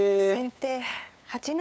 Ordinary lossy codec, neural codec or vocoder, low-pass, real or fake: none; codec, 16 kHz, 8 kbps, FunCodec, trained on LibriTTS, 25 frames a second; none; fake